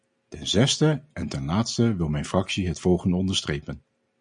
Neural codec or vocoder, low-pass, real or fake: none; 9.9 kHz; real